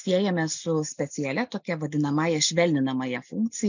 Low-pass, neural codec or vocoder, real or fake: 7.2 kHz; none; real